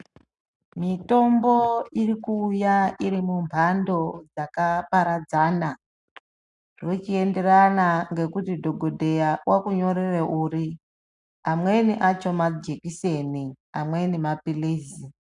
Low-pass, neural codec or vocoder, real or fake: 10.8 kHz; none; real